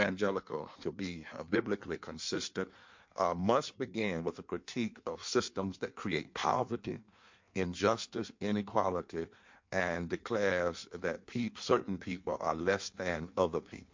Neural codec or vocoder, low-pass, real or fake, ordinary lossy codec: codec, 16 kHz in and 24 kHz out, 1.1 kbps, FireRedTTS-2 codec; 7.2 kHz; fake; MP3, 48 kbps